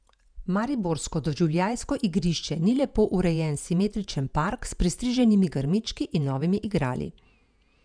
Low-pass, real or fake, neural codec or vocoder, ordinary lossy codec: 9.9 kHz; fake; vocoder, 48 kHz, 128 mel bands, Vocos; none